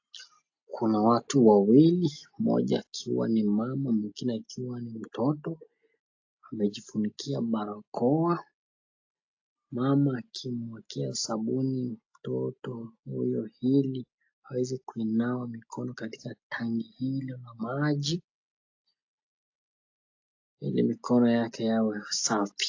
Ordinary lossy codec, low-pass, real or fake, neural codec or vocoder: AAC, 48 kbps; 7.2 kHz; real; none